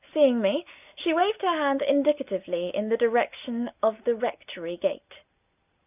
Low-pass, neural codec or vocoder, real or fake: 3.6 kHz; none; real